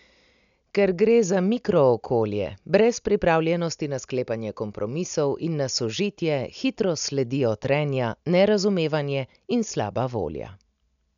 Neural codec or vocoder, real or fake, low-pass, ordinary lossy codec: none; real; 7.2 kHz; none